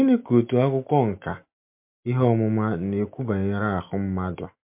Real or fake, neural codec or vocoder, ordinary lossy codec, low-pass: real; none; MP3, 32 kbps; 3.6 kHz